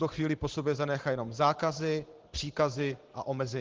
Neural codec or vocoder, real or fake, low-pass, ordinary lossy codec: vocoder, 44.1 kHz, 128 mel bands every 512 samples, BigVGAN v2; fake; 7.2 kHz; Opus, 16 kbps